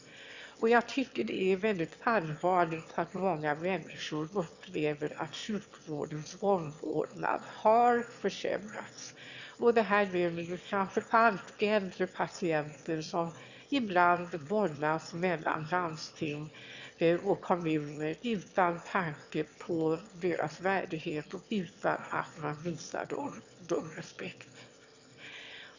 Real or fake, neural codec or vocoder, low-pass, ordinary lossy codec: fake; autoencoder, 22.05 kHz, a latent of 192 numbers a frame, VITS, trained on one speaker; 7.2 kHz; Opus, 64 kbps